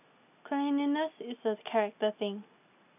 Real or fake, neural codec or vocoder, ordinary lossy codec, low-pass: real; none; none; 3.6 kHz